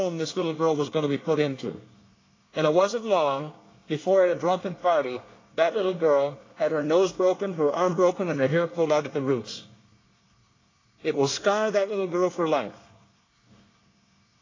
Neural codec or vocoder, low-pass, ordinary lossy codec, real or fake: codec, 24 kHz, 1 kbps, SNAC; 7.2 kHz; AAC, 32 kbps; fake